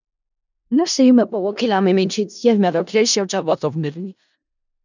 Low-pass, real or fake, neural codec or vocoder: 7.2 kHz; fake; codec, 16 kHz in and 24 kHz out, 0.4 kbps, LongCat-Audio-Codec, four codebook decoder